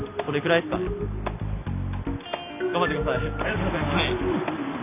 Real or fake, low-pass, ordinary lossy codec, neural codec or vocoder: real; 3.6 kHz; AAC, 32 kbps; none